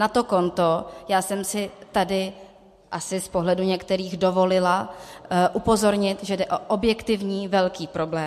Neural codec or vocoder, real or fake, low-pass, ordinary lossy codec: none; real; 14.4 kHz; MP3, 64 kbps